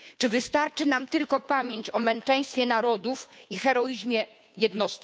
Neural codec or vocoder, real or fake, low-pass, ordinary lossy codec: codec, 16 kHz, 2 kbps, FunCodec, trained on Chinese and English, 25 frames a second; fake; none; none